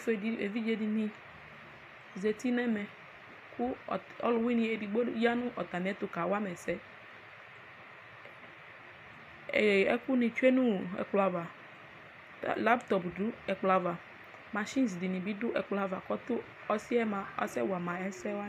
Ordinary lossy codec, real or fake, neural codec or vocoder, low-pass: AAC, 96 kbps; real; none; 14.4 kHz